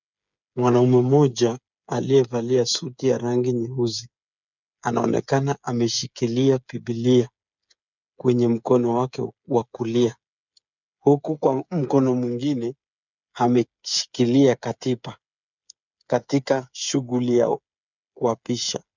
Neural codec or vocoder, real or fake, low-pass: codec, 16 kHz, 8 kbps, FreqCodec, smaller model; fake; 7.2 kHz